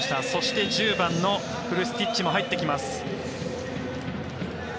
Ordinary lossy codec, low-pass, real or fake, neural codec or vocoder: none; none; real; none